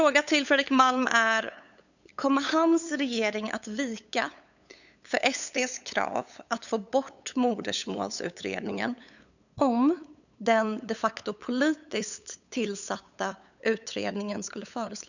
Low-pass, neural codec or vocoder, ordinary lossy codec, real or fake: 7.2 kHz; codec, 16 kHz, 8 kbps, FunCodec, trained on LibriTTS, 25 frames a second; none; fake